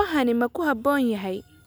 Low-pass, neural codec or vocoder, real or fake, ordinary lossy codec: none; none; real; none